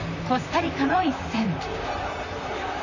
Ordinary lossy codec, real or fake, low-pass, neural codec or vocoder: none; fake; 7.2 kHz; vocoder, 44.1 kHz, 128 mel bands, Pupu-Vocoder